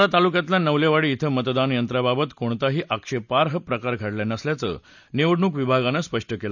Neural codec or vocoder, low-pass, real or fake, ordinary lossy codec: none; 7.2 kHz; real; none